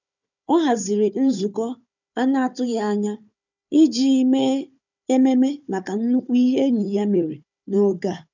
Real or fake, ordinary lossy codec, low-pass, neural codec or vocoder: fake; none; 7.2 kHz; codec, 16 kHz, 4 kbps, FunCodec, trained on Chinese and English, 50 frames a second